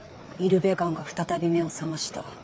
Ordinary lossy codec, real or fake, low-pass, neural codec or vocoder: none; fake; none; codec, 16 kHz, 4 kbps, FreqCodec, larger model